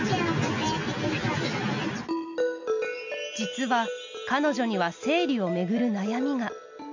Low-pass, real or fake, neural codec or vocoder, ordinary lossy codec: 7.2 kHz; real; none; none